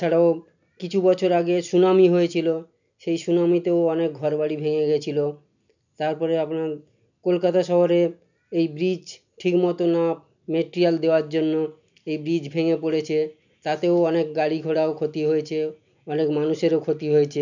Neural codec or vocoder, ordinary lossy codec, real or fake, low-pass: none; none; real; 7.2 kHz